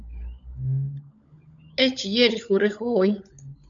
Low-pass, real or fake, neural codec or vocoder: 7.2 kHz; fake; codec, 16 kHz, 16 kbps, FunCodec, trained on LibriTTS, 50 frames a second